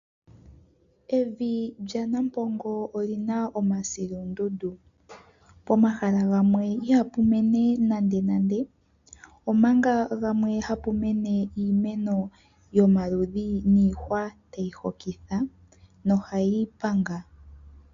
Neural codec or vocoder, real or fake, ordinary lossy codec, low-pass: none; real; MP3, 64 kbps; 7.2 kHz